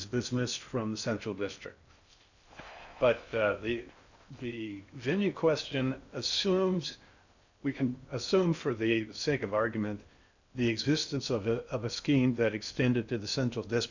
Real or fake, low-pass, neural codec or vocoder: fake; 7.2 kHz; codec, 16 kHz in and 24 kHz out, 0.6 kbps, FocalCodec, streaming, 4096 codes